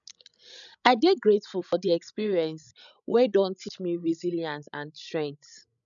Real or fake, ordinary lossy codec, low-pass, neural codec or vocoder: fake; none; 7.2 kHz; codec, 16 kHz, 16 kbps, FreqCodec, larger model